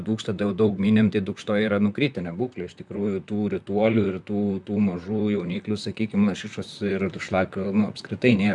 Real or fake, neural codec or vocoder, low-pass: fake; vocoder, 44.1 kHz, 128 mel bands, Pupu-Vocoder; 10.8 kHz